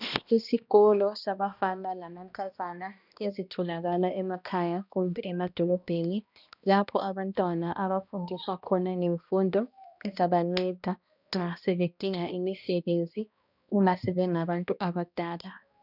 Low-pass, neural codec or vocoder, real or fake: 5.4 kHz; codec, 16 kHz, 1 kbps, X-Codec, HuBERT features, trained on balanced general audio; fake